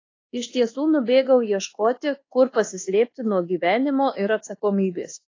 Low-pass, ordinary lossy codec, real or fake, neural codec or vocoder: 7.2 kHz; AAC, 32 kbps; fake; codec, 24 kHz, 1.2 kbps, DualCodec